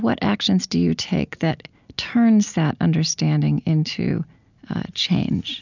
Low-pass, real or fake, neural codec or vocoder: 7.2 kHz; real; none